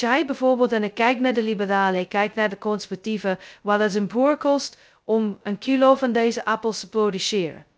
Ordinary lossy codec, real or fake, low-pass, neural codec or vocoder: none; fake; none; codec, 16 kHz, 0.2 kbps, FocalCodec